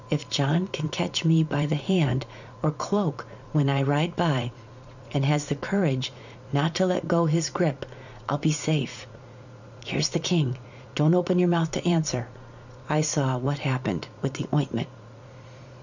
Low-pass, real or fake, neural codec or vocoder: 7.2 kHz; fake; vocoder, 44.1 kHz, 80 mel bands, Vocos